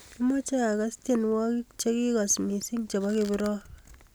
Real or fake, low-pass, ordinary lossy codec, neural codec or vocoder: real; none; none; none